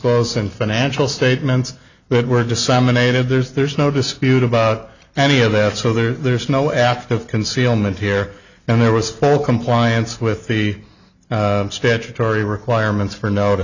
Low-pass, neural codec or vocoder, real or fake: 7.2 kHz; none; real